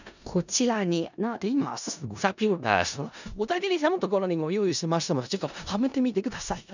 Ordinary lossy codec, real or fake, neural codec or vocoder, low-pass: none; fake; codec, 16 kHz in and 24 kHz out, 0.4 kbps, LongCat-Audio-Codec, four codebook decoder; 7.2 kHz